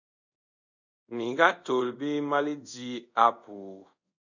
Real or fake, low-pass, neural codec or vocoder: fake; 7.2 kHz; codec, 24 kHz, 0.5 kbps, DualCodec